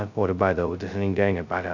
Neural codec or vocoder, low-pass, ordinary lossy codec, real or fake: codec, 16 kHz, 0.2 kbps, FocalCodec; 7.2 kHz; none; fake